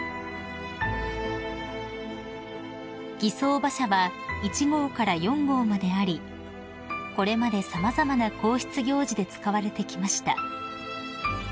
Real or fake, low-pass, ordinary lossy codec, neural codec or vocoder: real; none; none; none